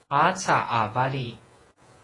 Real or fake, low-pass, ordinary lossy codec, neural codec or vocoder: fake; 10.8 kHz; AAC, 32 kbps; vocoder, 48 kHz, 128 mel bands, Vocos